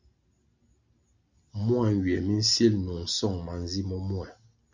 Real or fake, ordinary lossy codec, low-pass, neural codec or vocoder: real; Opus, 64 kbps; 7.2 kHz; none